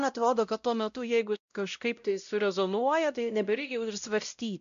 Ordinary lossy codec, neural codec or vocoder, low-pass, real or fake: AAC, 96 kbps; codec, 16 kHz, 0.5 kbps, X-Codec, WavLM features, trained on Multilingual LibriSpeech; 7.2 kHz; fake